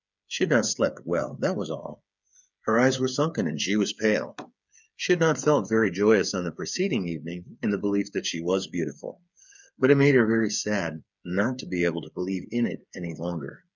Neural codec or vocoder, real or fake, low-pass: codec, 16 kHz, 8 kbps, FreqCodec, smaller model; fake; 7.2 kHz